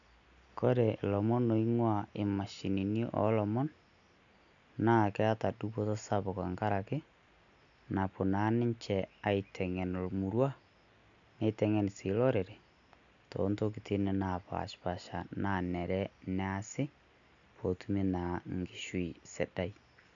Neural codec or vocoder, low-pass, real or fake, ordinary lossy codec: none; 7.2 kHz; real; none